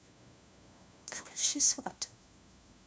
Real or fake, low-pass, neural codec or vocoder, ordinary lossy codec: fake; none; codec, 16 kHz, 1 kbps, FunCodec, trained on LibriTTS, 50 frames a second; none